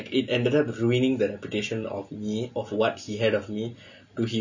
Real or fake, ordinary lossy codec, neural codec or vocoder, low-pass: real; none; none; 7.2 kHz